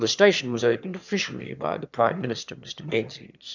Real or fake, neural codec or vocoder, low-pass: fake; autoencoder, 22.05 kHz, a latent of 192 numbers a frame, VITS, trained on one speaker; 7.2 kHz